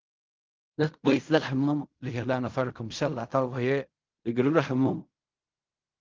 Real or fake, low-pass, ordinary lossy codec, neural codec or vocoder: fake; 7.2 kHz; Opus, 32 kbps; codec, 16 kHz in and 24 kHz out, 0.4 kbps, LongCat-Audio-Codec, fine tuned four codebook decoder